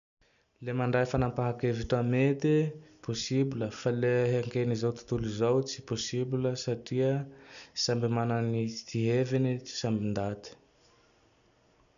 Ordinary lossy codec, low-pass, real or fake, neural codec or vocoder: none; 7.2 kHz; real; none